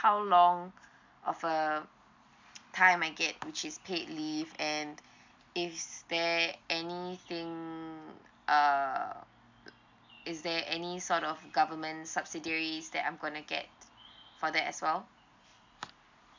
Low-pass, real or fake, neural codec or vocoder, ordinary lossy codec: 7.2 kHz; real; none; MP3, 64 kbps